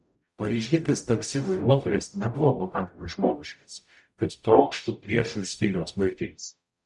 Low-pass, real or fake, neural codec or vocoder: 10.8 kHz; fake; codec, 44.1 kHz, 0.9 kbps, DAC